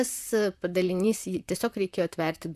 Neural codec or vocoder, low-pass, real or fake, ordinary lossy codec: vocoder, 44.1 kHz, 128 mel bands, Pupu-Vocoder; 14.4 kHz; fake; MP3, 96 kbps